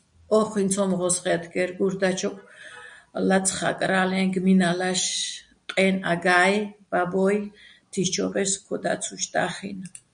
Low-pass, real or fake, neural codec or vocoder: 9.9 kHz; real; none